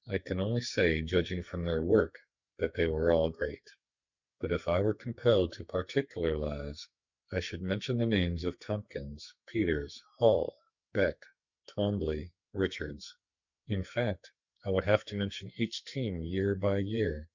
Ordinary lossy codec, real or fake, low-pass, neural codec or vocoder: Opus, 64 kbps; fake; 7.2 kHz; codec, 44.1 kHz, 2.6 kbps, SNAC